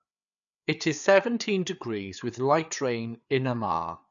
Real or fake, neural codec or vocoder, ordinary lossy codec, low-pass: fake; codec, 16 kHz, 4 kbps, FreqCodec, larger model; none; 7.2 kHz